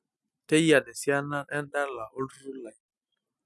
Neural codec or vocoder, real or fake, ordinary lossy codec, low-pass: none; real; none; none